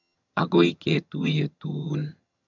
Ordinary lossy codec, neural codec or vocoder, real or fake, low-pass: none; vocoder, 22.05 kHz, 80 mel bands, HiFi-GAN; fake; 7.2 kHz